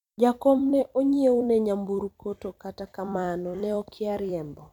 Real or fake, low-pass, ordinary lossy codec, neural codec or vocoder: fake; 19.8 kHz; none; vocoder, 44.1 kHz, 128 mel bands every 256 samples, BigVGAN v2